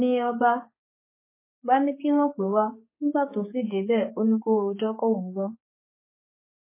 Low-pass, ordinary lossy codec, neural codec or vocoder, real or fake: 3.6 kHz; MP3, 16 kbps; codec, 16 kHz, 4 kbps, X-Codec, HuBERT features, trained on general audio; fake